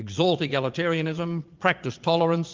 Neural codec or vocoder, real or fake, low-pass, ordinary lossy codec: vocoder, 22.05 kHz, 80 mel bands, Vocos; fake; 7.2 kHz; Opus, 32 kbps